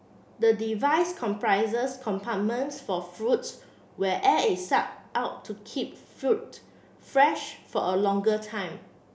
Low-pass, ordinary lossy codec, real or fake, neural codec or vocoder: none; none; real; none